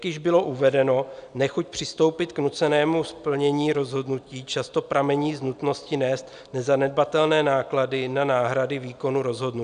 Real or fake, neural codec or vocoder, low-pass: real; none; 9.9 kHz